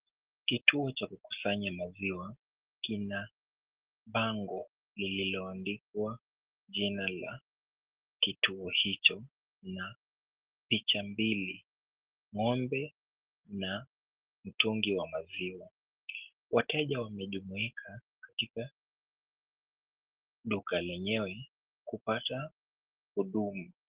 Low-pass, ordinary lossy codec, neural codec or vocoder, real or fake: 3.6 kHz; Opus, 16 kbps; none; real